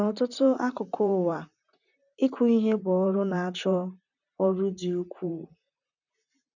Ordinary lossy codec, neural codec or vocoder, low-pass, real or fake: none; vocoder, 44.1 kHz, 128 mel bands, Pupu-Vocoder; 7.2 kHz; fake